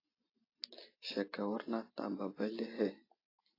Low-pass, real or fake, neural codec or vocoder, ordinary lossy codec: 5.4 kHz; real; none; AAC, 24 kbps